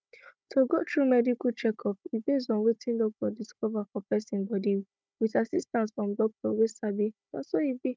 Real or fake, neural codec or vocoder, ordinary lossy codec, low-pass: fake; codec, 16 kHz, 16 kbps, FunCodec, trained on Chinese and English, 50 frames a second; none; none